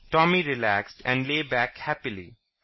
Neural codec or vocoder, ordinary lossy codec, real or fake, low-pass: none; MP3, 24 kbps; real; 7.2 kHz